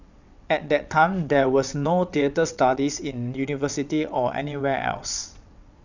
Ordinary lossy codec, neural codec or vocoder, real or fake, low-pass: none; vocoder, 22.05 kHz, 80 mel bands, WaveNeXt; fake; 7.2 kHz